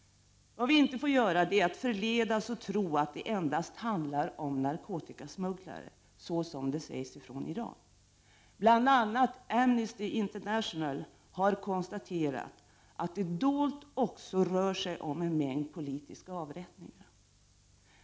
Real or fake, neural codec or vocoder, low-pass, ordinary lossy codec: real; none; none; none